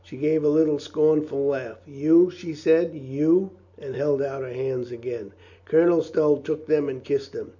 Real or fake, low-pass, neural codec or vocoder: real; 7.2 kHz; none